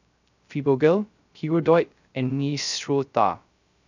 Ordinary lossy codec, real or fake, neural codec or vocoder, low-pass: none; fake; codec, 16 kHz, 0.3 kbps, FocalCodec; 7.2 kHz